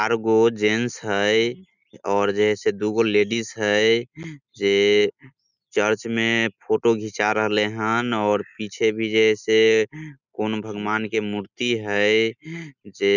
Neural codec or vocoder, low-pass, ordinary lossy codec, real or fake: none; 7.2 kHz; none; real